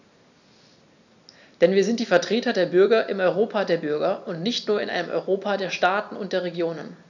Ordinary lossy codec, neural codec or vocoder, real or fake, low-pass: none; none; real; 7.2 kHz